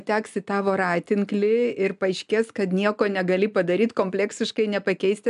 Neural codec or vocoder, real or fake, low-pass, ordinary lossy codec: none; real; 10.8 kHz; MP3, 96 kbps